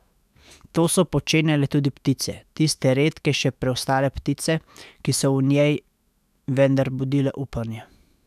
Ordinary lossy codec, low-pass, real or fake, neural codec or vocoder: none; 14.4 kHz; fake; autoencoder, 48 kHz, 128 numbers a frame, DAC-VAE, trained on Japanese speech